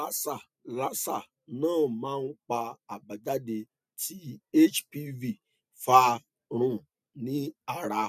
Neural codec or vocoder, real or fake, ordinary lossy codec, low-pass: none; real; none; 14.4 kHz